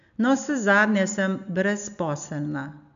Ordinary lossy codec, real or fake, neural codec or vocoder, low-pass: none; real; none; 7.2 kHz